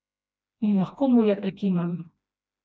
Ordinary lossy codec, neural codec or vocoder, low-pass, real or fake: none; codec, 16 kHz, 1 kbps, FreqCodec, smaller model; none; fake